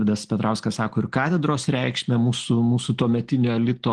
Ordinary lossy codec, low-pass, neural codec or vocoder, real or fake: Opus, 16 kbps; 9.9 kHz; none; real